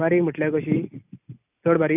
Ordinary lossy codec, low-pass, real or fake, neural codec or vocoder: none; 3.6 kHz; real; none